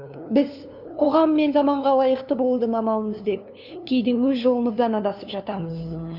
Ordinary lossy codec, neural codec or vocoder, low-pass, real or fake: none; codec, 16 kHz, 2 kbps, FunCodec, trained on LibriTTS, 25 frames a second; 5.4 kHz; fake